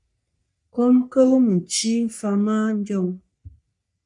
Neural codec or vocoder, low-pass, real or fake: codec, 44.1 kHz, 3.4 kbps, Pupu-Codec; 10.8 kHz; fake